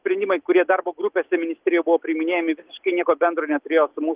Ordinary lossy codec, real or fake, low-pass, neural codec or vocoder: Opus, 32 kbps; real; 3.6 kHz; none